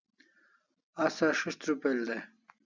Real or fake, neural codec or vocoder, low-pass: real; none; 7.2 kHz